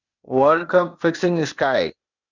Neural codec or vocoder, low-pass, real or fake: codec, 16 kHz, 0.8 kbps, ZipCodec; 7.2 kHz; fake